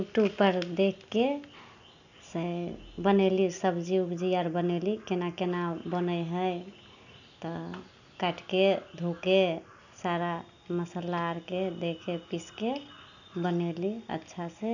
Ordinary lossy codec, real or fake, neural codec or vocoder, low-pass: none; real; none; 7.2 kHz